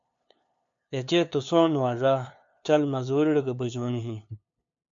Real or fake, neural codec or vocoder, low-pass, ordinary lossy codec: fake; codec, 16 kHz, 2 kbps, FunCodec, trained on LibriTTS, 25 frames a second; 7.2 kHz; AAC, 64 kbps